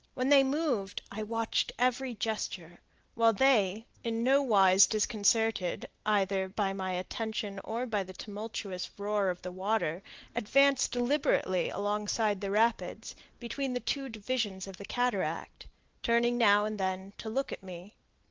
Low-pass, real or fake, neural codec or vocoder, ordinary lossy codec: 7.2 kHz; real; none; Opus, 16 kbps